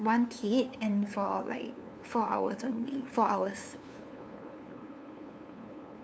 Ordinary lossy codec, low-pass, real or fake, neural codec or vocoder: none; none; fake; codec, 16 kHz, 2 kbps, FunCodec, trained on LibriTTS, 25 frames a second